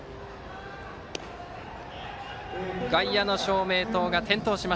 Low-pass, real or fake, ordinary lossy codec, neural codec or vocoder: none; real; none; none